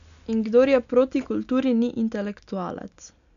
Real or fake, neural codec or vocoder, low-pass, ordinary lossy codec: real; none; 7.2 kHz; none